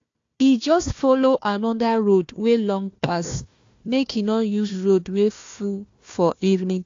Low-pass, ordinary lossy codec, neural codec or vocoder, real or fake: 7.2 kHz; AAC, 48 kbps; codec, 16 kHz, 1 kbps, FunCodec, trained on Chinese and English, 50 frames a second; fake